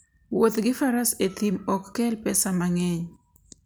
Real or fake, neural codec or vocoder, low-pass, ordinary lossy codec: real; none; none; none